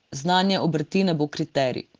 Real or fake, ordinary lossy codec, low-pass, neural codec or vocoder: real; Opus, 16 kbps; 7.2 kHz; none